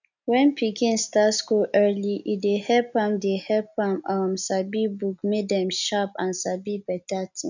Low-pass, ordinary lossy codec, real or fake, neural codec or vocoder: 7.2 kHz; none; real; none